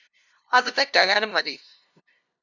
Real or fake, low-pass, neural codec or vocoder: fake; 7.2 kHz; codec, 16 kHz, 0.5 kbps, FunCodec, trained on LibriTTS, 25 frames a second